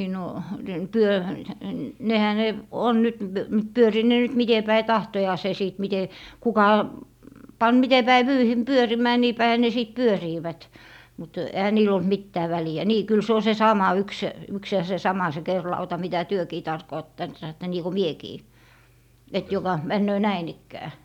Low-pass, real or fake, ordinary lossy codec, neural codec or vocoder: 19.8 kHz; real; none; none